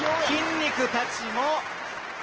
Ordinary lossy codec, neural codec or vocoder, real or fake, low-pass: Opus, 16 kbps; none; real; 7.2 kHz